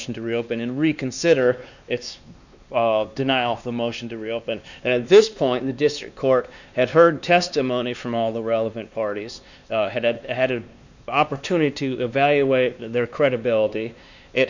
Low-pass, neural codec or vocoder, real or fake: 7.2 kHz; codec, 16 kHz, 2 kbps, X-Codec, WavLM features, trained on Multilingual LibriSpeech; fake